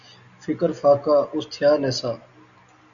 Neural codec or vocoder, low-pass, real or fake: none; 7.2 kHz; real